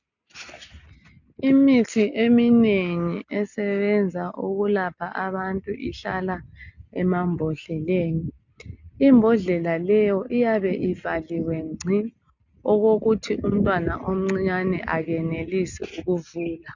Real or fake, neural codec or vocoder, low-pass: real; none; 7.2 kHz